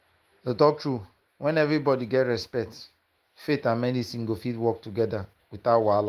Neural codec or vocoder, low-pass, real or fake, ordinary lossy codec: autoencoder, 48 kHz, 128 numbers a frame, DAC-VAE, trained on Japanese speech; 14.4 kHz; fake; Opus, 24 kbps